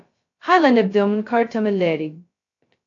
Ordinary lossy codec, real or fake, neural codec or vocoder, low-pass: AAC, 48 kbps; fake; codec, 16 kHz, 0.2 kbps, FocalCodec; 7.2 kHz